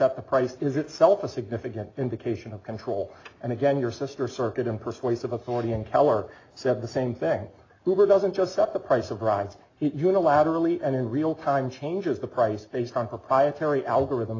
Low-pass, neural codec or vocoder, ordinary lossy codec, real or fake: 7.2 kHz; none; AAC, 32 kbps; real